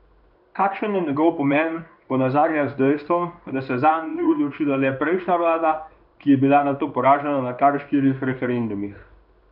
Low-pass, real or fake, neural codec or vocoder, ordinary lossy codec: 5.4 kHz; fake; codec, 16 kHz in and 24 kHz out, 1 kbps, XY-Tokenizer; none